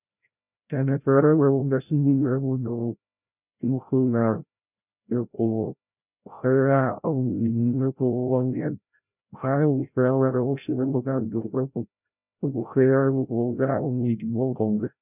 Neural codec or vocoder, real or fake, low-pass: codec, 16 kHz, 0.5 kbps, FreqCodec, larger model; fake; 3.6 kHz